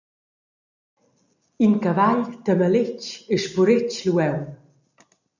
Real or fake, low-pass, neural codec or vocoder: real; 7.2 kHz; none